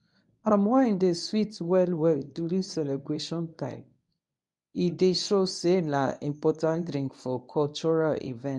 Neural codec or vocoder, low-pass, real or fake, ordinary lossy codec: codec, 24 kHz, 0.9 kbps, WavTokenizer, medium speech release version 1; 10.8 kHz; fake; none